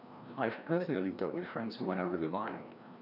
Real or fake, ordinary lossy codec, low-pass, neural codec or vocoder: fake; none; 5.4 kHz; codec, 16 kHz, 1 kbps, FreqCodec, larger model